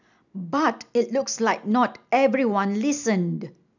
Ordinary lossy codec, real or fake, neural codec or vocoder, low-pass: none; real; none; 7.2 kHz